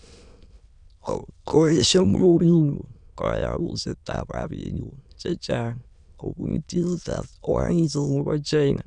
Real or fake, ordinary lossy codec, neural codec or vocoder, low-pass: fake; none; autoencoder, 22.05 kHz, a latent of 192 numbers a frame, VITS, trained on many speakers; 9.9 kHz